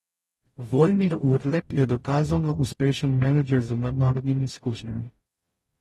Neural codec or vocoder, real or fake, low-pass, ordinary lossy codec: codec, 44.1 kHz, 0.9 kbps, DAC; fake; 19.8 kHz; AAC, 32 kbps